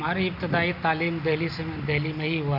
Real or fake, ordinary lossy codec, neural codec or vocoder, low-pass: real; none; none; 5.4 kHz